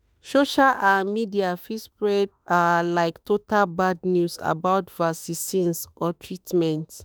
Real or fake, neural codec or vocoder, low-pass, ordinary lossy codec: fake; autoencoder, 48 kHz, 32 numbers a frame, DAC-VAE, trained on Japanese speech; none; none